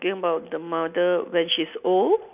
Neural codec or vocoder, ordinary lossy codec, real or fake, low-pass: none; none; real; 3.6 kHz